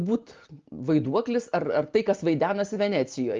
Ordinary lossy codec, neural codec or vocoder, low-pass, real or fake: Opus, 32 kbps; none; 7.2 kHz; real